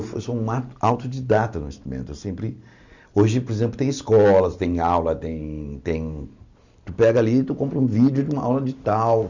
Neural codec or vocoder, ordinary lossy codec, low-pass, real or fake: none; none; 7.2 kHz; real